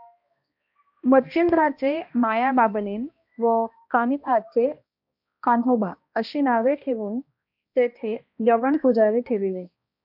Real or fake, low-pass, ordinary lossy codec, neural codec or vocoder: fake; 5.4 kHz; MP3, 48 kbps; codec, 16 kHz, 1 kbps, X-Codec, HuBERT features, trained on balanced general audio